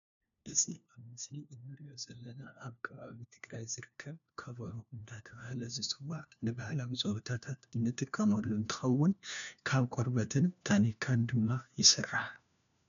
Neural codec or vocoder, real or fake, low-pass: codec, 16 kHz, 1 kbps, FunCodec, trained on LibriTTS, 50 frames a second; fake; 7.2 kHz